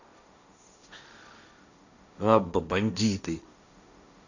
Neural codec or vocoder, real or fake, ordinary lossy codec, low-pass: codec, 16 kHz, 1.1 kbps, Voila-Tokenizer; fake; none; 7.2 kHz